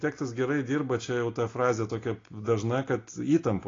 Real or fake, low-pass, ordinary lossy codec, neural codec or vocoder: real; 7.2 kHz; AAC, 32 kbps; none